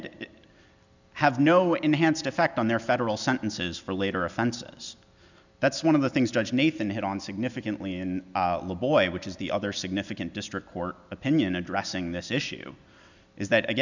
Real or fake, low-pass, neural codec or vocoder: real; 7.2 kHz; none